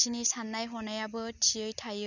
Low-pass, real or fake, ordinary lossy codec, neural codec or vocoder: 7.2 kHz; real; none; none